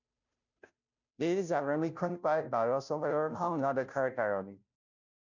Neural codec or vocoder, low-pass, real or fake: codec, 16 kHz, 0.5 kbps, FunCodec, trained on Chinese and English, 25 frames a second; 7.2 kHz; fake